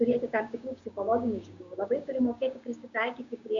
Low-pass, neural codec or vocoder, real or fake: 7.2 kHz; codec, 16 kHz, 6 kbps, DAC; fake